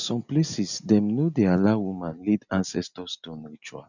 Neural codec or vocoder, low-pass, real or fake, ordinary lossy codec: none; 7.2 kHz; real; none